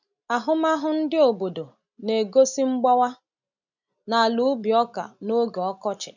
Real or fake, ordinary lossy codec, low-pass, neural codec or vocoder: real; none; 7.2 kHz; none